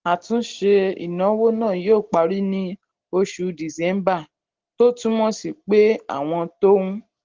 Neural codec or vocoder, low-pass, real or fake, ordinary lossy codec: none; 7.2 kHz; real; Opus, 16 kbps